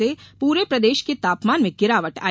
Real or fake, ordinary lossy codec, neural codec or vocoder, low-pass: real; none; none; none